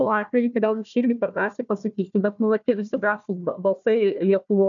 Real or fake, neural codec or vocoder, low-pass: fake; codec, 16 kHz, 1 kbps, FunCodec, trained on Chinese and English, 50 frames a second; 7.2 kHz